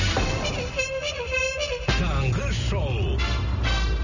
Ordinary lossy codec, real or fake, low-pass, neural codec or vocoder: none; real; 7.2 kHz; none